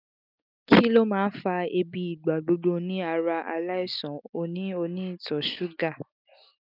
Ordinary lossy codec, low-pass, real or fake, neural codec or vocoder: none; 5.4 kHz; real; none